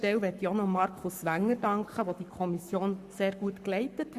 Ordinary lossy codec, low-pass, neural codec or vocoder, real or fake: Opus, 64 kbps; 14.4 kHz; codec, 44.1 kHz, 7.8 kbps, Pupu-Codec; fake